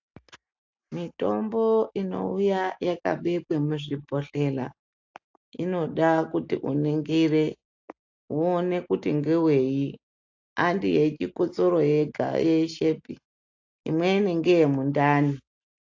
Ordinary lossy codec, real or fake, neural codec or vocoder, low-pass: AAC, 48 kbps; real; none; 7.2 kHz